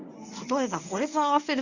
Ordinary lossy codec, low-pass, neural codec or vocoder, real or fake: none; 7.2 kHz; codec, 24 kHz, 0.9 kbps, WavTokenizer, medium speech release version 1; fake